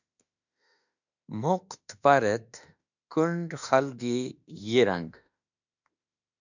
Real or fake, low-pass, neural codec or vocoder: fake; 7.2 kHz; autoencoder, 48 kHz, 32 numbers a frame, DAC-VAE, trained on Japanese speech